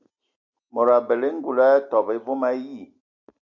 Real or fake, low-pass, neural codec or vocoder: real; 7.2 kHz; none